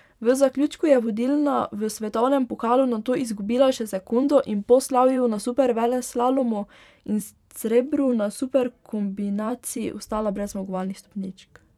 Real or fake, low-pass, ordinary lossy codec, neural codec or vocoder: fake; 19.8 kHz; none; vocoder, 44.1 kHz, 128 mel bands every 512 samples, BigVGAN v2